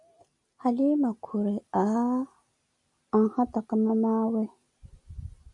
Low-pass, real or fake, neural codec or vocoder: 10.8 kHz; real; none